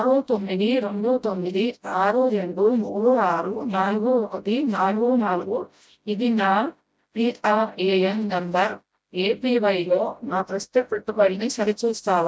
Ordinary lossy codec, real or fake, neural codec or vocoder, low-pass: none; fake; codec, 16 kHz, 0.5 kbps, FreqCodec, smaller model; none